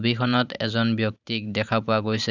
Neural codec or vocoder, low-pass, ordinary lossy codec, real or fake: vocoder, 44.1 kHz, 128 mel bands every 512 samples, BigVGAN v2; 7.2 kHz; none; fake